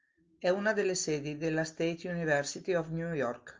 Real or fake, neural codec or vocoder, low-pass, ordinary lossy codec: real; none; 7.2 kHz; Opus, 24 kbps